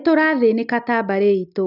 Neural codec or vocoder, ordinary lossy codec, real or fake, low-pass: none; none; real; 5.4 kHz